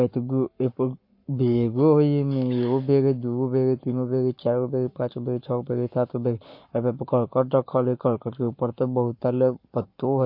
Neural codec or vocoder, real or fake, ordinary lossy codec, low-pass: codec, 44.1 kHz, 7.8 kbps, Pupu-Codec; fake; MP3, 32 kbps; 5.4 kHz